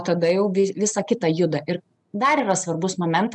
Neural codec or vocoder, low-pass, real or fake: none; 10.8 kHz; real